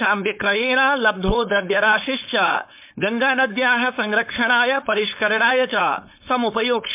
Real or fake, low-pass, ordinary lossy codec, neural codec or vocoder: fake; 3.6 kHz; MP3, 32 kbps; codec, 16 kHz, 4.8 kbps, FACodec